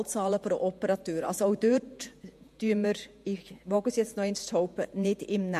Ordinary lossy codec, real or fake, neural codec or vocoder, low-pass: MP3, 64 kbps; fake; vocoder, 48 kHz, 128 mel bands, Vocos; 14.4 kHz